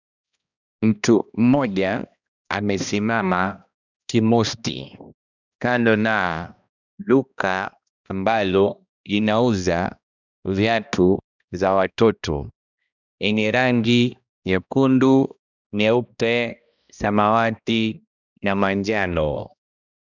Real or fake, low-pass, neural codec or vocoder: fake; 7.2 kHz; codec, 16 kHz, 1 kbps, X-Codec, HuBERT features, trained on balanced general audio